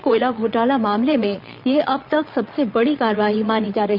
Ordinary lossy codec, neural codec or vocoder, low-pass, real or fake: Opus, 64 kbps; vocoder, 22.05 kHz, 80 mel bands, Vocos; 5.4 kHz; fake